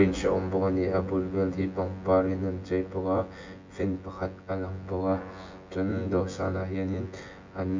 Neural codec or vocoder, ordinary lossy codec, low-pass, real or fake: vocoder, 24 kHz, 100 mel bands, Vocos; MP3, 48 kbps; 7.2 kHz; fake